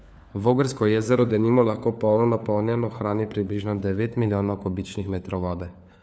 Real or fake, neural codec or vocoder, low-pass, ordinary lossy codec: fake; codec, 16 kHz, 4 kbps, FunCodec, trained on LibriTTS, 50 frames a second; none; none